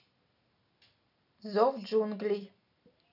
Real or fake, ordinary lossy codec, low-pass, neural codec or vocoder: real; none; 5.4 kHz; none